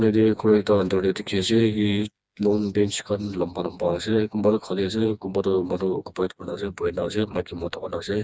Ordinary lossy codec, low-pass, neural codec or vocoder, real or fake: none; none; codec, 16 kHz, 2 kbps, FreqCodec, smaller model; fake